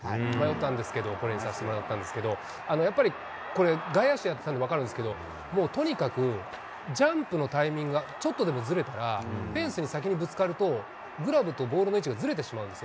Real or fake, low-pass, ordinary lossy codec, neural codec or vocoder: real; none; none; none